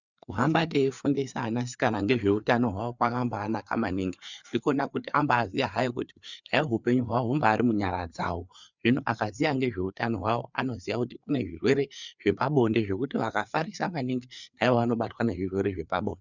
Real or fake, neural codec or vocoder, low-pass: fake; codec, 16 kHz, 4 kbps, FreqCodec, larger model; 7.2 kHz